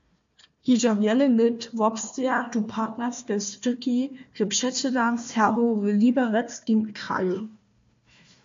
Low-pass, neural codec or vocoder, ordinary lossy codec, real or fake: 7.2 kHz; codec, 16 kHz, 1 kbps, FunCodec, trained on Chinese and English, 50 frames a second; MP3, 48 kbps; fake